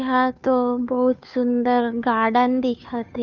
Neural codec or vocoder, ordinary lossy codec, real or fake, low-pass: codec, 16 kHz, 2 kbps, FunCodec, trained on Chinese and English, 25 frames a second; none; fake; 7.2 kHz